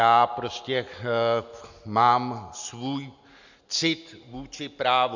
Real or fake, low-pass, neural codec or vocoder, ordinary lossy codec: real; 7.2 kHz; none; Opus, 64 kbps